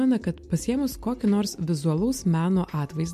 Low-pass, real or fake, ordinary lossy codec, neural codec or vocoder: 14.4 kHz; real; MP3, 64 kbps; none